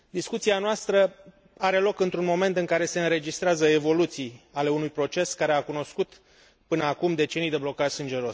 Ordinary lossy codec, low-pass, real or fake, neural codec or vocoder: none; none; real; none